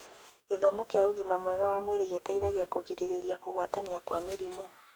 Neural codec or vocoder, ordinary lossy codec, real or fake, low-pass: codec, 44.1 kHz, 2.6 kbps, DAC; none; fake; none